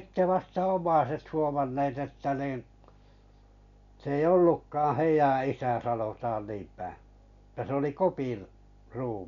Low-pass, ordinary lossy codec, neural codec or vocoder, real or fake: 7.2 kHz; none; none; real